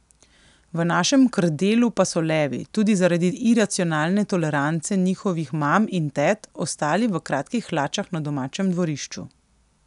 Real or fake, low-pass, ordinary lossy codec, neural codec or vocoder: real; 10.8 kHz; none; none